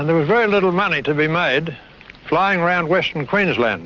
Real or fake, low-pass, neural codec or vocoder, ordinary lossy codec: real; 7.2 kHz; none; Opus, 24 kbps